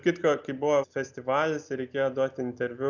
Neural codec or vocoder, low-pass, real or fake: none; 7.2 kHz; real